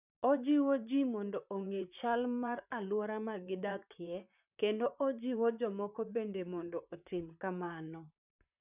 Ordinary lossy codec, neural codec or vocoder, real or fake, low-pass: none; vocoder, 44.1 kHz, 128 mel bands, Pupu-Vocoder; fake; 3.6 kHz